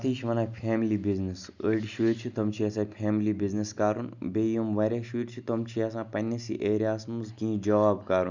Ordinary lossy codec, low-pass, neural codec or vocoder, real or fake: none; 7.2 kHz; none; real